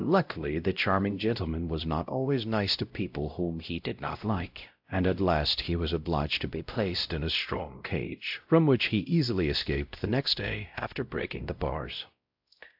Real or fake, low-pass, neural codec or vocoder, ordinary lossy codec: fake; 5.4 kHz; codec, 16 kHz, 0.5 kbps, X-Codec, WavLM features, trained on Multilingual LibriSpeech; AAC, 48 kbps